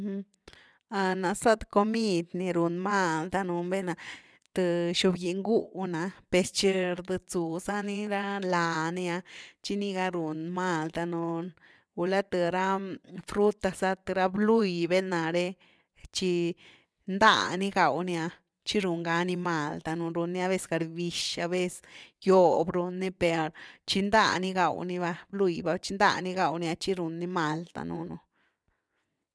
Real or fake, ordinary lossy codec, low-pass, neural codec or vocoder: fake; none; none; vocoder, 22.05 kHz, 80 mel bands, WaveNeXt